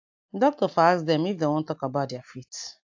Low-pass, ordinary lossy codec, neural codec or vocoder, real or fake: 7.2 kHz; AAC, 48 kbps; none; real